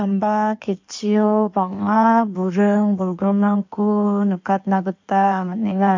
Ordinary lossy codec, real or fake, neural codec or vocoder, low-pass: MP3, 48 kbps; fake; codec, 16 kHz in and 24 kHz out, 1.1 kbps, FireRedTTS-2 codec; 7.2 kHz